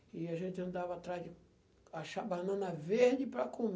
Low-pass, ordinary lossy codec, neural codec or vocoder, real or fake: none; none; none; real